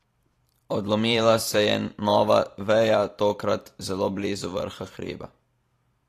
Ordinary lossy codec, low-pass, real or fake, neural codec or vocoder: AAC, 48 kbps; 14.4 kHz; fake; vocoder, 44.1 kHz, 128 mel bands every 512 samples, BigVGAN v2